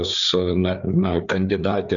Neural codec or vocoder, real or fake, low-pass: codec, 16 kHz, 4 kbps, FreqCodec, larger model; fake; 7.2 kHz